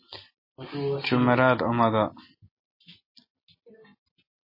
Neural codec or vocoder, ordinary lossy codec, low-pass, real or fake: none; MP3, 24 kbps; 5.4 kHz; real